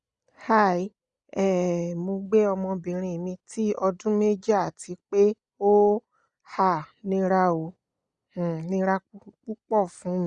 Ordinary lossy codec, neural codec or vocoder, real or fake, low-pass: Opus, 64 kbps; none; real; 9.9 kHz